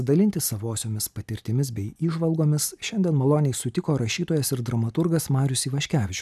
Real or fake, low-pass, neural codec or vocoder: real; 14.4 kHz; none